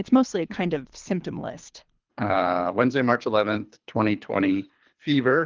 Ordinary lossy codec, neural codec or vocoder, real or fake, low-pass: Opus, 32 kbps; codec, 24 kHz, 3 kbps, HILCodec; fake; 7.2 kHz